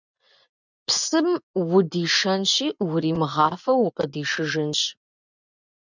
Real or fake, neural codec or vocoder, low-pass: fake; vocoder, 24 kHz, 100 mel bands, Vocos; 7.2 kHz